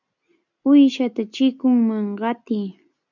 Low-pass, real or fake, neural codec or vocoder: 7.2 kHz; real; none